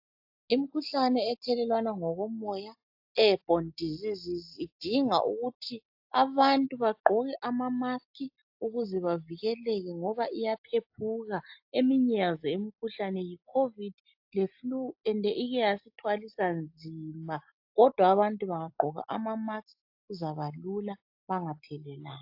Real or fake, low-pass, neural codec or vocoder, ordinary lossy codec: real; 5.4 kHz; none; AAC, 48 kbps